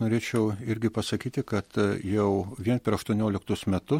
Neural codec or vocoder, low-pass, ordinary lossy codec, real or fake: none; 19.8 kHz; MP3, 64 kbps; real